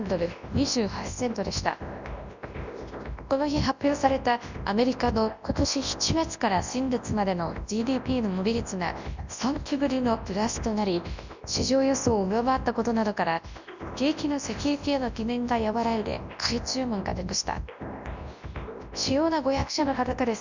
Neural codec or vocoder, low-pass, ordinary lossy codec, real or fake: codec, 24 kHz, 0.9 kbps, WavTokenizer, large speech release; 7.2 kHz; Opus, 64 kbps; fake